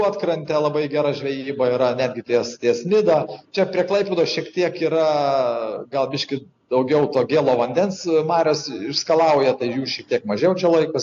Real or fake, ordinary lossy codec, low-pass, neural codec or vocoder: real; AAC, 48 kbps; 7.2 kHz; none